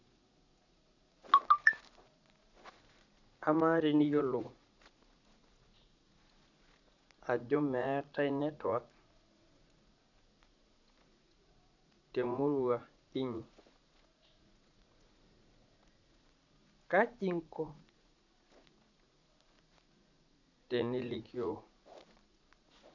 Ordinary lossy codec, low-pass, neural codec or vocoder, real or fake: none; 7.2 kHz; vocoder, 44.1 kHz, 80 mel bands, Vocos; fake